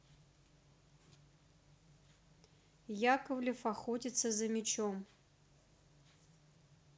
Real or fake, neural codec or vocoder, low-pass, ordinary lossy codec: real; none; none; none